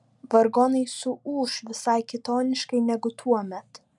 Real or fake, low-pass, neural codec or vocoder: real; 10.8 kHz; none